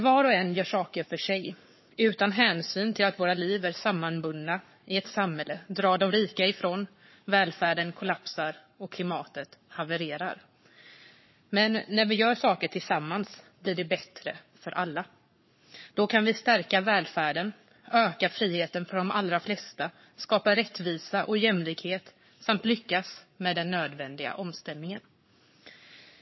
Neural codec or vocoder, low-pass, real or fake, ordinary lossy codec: codec, 44.1 kHz, 7.8 kbps, Pupu-Codec; 7.2 kHz; fake; MP3, 24 kbps